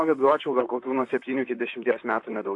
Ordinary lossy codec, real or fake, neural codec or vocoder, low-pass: AAC, 48 kbps; fake; vocoder, 44.1 kHz, 128 mel bands, Pupu-Vocoder; 10.8 kHz